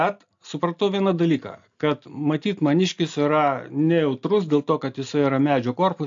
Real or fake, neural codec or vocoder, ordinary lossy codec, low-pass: real; none; AAC, 64 kbps; 7.2 kHz